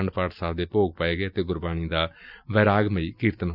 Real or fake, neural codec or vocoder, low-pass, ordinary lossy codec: real; none; 5.4 kHz; none